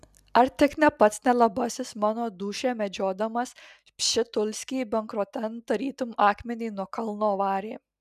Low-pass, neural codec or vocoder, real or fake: 14.4 kHz; none; real